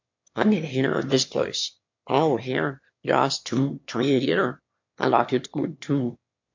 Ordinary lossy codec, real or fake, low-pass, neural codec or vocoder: MP3, 48 kbps; fake; 7.2 kHz; autoencoder, 22.05 kHz, a latent of 192 numbers a frame, VITS, trained on one speaker